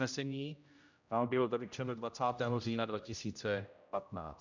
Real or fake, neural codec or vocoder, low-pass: fake; codec, 16 kHz, 0.5 kbps, X-Codec, HuBERT features, trained on general audio; 7.2 kHz